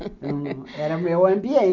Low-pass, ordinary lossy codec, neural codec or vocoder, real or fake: 7.2 kHz; none; none; real